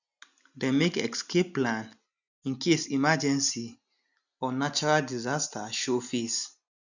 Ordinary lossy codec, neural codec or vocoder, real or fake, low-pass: none; none; real; 7.2 kHz